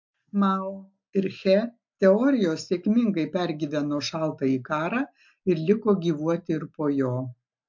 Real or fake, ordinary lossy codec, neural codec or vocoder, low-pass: real; MP3, 48 kbps; none; 7.2 kHz